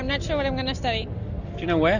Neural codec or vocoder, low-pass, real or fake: none; 7.2 kHz; real